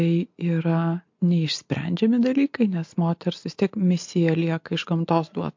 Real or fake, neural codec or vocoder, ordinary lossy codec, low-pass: real; none; MP3, 48 kbps; 7.2 kHz